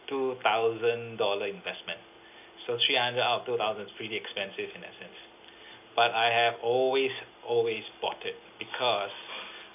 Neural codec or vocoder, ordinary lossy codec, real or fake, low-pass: none; AAC, 32 kbps; real; 3.6 kHz